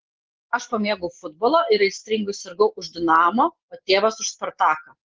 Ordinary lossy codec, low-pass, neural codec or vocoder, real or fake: Opus, 16 kbps; 7.2 kHz; none; real